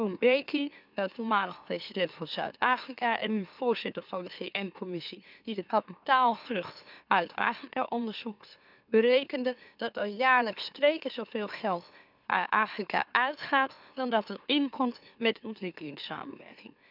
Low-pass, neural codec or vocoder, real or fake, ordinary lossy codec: 5.4 kHz; autoencoder, 44.1 kHz, a latent of 192 numbers a frame, MeloTTS; fake; none